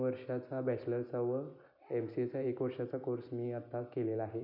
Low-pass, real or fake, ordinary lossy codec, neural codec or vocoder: 5.4 kHz; real; none; none